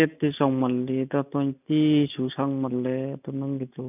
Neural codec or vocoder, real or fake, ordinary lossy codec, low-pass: none; real; none; 3.6 kHz